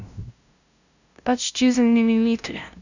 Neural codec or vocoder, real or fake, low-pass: codec, 16 kHz, 0.5 kbps, FunCodec, trained on LibriTTS, 25 frames a second; fake; 7.2 kHz